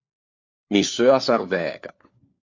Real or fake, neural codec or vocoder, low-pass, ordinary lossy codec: fake; codec, 16 kHz, 4 kbps, FunCodec, trained on LibriTTS, 50 frames a second; 7.2 kHz; MP3, 48 kbps